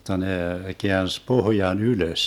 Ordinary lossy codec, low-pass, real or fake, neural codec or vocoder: none; 19.8 kHz; real; none